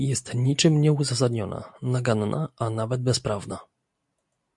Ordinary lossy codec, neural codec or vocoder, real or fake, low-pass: MP3, 64 kbps; none; real; 10.8 kHz